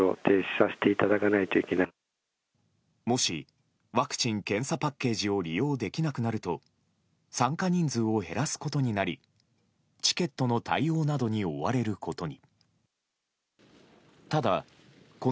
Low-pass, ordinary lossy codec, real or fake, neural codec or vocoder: none; none; real; none